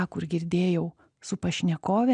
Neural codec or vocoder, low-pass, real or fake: none; 9.9 kHz; real